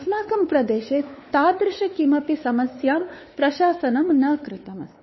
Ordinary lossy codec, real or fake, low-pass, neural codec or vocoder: MP3, 24 kbps; fake; 7.2 kHz; codec, 16 kHz, 4 kbps, X-Codec, WavLM features, trained on Multilingual LibriSpeech